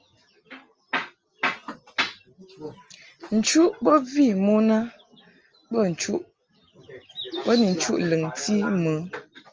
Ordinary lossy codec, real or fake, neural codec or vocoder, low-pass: Opus, 24 kbps; real; none; 7.2 kHz